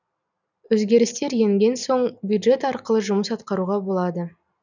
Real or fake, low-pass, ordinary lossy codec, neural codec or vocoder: real; 7.2 kHz; none; none